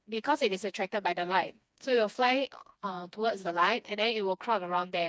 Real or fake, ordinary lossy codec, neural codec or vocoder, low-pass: fake; none; codec, 16 kHz, 2 kbps, FreqCodec, smaller model; none